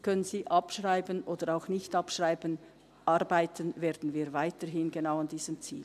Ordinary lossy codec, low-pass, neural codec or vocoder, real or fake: none; 14.4 kHz; none; real